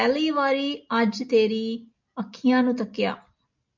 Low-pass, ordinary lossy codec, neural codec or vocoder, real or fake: 7.2 kHz; MP3, 64 kbps; none; real